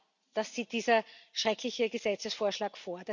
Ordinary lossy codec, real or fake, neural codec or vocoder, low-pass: none; real; none; 7.2 kHz